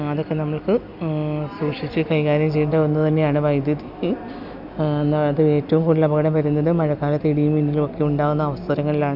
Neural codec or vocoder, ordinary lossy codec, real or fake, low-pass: none; none; real; 5.4 kHz